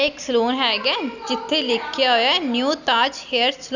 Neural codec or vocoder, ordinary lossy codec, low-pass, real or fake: none; none; 7.2 kHz; real